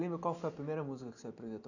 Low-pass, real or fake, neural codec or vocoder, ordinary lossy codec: 7.2 kHz; real; none; none